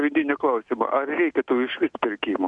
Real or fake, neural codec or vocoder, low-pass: real; none; 10.8 kHz